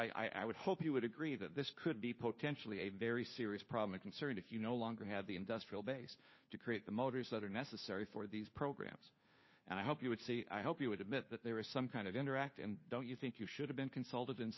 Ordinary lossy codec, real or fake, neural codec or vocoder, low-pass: MP3, 24 kbps; fake; codec, 16 kHz, 2 kbps, FunCodec, trained on Chinese and English, 25 frames a second; 7.2 kHz